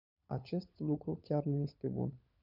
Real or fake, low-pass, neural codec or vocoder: fake; 5.4 kHz; codec, 16 kHz, 16 kbps, FunCodec, trained on LibriTTS, 50 frames a second